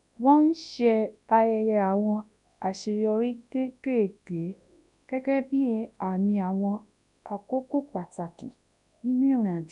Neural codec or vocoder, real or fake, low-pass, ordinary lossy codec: codec, 24 kHz, 0.9 kbps, WavTokenizer, large speech release; fake; 10.8 kHz; none